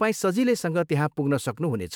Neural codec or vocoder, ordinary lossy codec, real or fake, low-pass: autoencoder, 48 kHz, 128 numbers a frame, DAC-VAE, trained on Japanese speech; none; fake; none